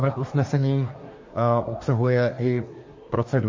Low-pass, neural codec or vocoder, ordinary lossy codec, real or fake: 7.2 kHz; codec, 24 kHz, 1 kbps, SNAC; MP3, 32 kbps; fake